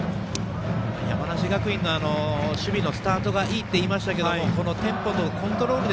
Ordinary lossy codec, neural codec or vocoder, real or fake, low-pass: none; none; real; none